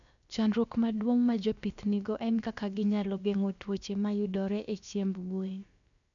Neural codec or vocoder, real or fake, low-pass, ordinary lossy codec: codec, 16 kHz, about 1 kbps, DyCAST, with the encoder's durations; fake; 7.2 kHz; none